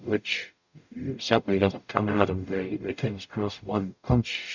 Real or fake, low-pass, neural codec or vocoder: fake; 7.2 kHz; codec, 44.1 kHz, 0.9 kbps, DAC